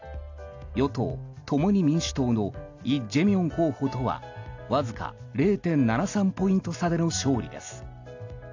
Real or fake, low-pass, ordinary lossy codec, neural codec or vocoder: real; 7.2 kHz; AAC, 48 kbps; none